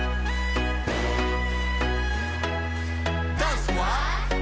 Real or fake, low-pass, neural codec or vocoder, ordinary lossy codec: real; none; none; none